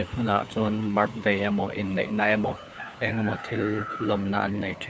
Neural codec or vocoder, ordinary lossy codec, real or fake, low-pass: codec, 16 kHz, 4 kbps, FunCodec, trained on LibriTTS, 50 frames a second; none; fake; none